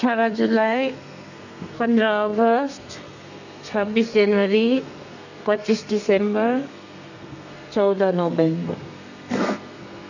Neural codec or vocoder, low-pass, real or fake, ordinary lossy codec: codec, 32 kHz, 1.9 kbps, SNAC; 7.2 kHz; fake; none